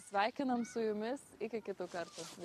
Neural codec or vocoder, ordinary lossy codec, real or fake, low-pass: none; MP3, 64 kbps; real; 14.4 kHz